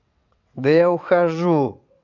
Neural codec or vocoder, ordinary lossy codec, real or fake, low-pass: vocoder, 22.05 kHz, 80 mel bands, WaveNeXt; none; fake; 7.2 kHz